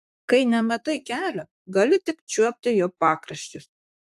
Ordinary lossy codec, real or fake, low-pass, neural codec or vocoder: AAC, 96 kbps; fake; 14.4 kHz; vocoder, 44.1 kHz, 128 mel bands, Pupu-Vocoder